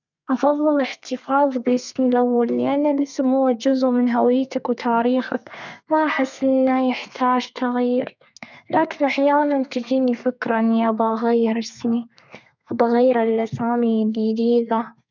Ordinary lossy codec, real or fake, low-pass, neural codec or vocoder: none; fake; 7.2 kHz; codec, 32 kHz, 1.9 kbps, SNAC